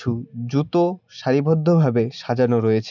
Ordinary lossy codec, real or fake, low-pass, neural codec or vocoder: none; real; 7.2 kHz; none